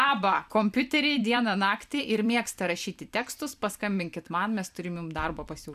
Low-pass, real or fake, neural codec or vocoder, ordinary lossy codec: 14.4 kHz; fake; vocoder, 44.1 kHz, 128 mel bands every 256 samples, BigVGAN v2; MP3, 96 kbps